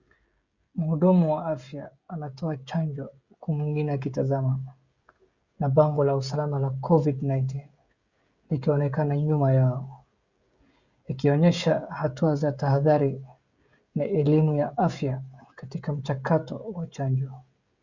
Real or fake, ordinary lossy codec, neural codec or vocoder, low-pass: fake; Opus, 64 kbps; codec, 16 kHz, 16 kbps, FreqCodec, smaller model; 7.2 kHz